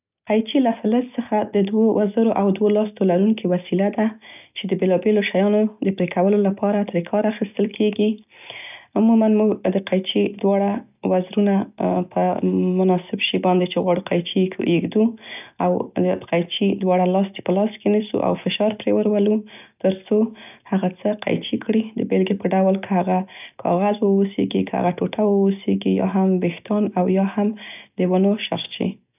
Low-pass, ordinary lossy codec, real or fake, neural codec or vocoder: 3.6 kHz; none; real; none